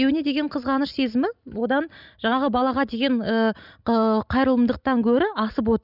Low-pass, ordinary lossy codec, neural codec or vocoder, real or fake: 5.4 kHz; none; none; real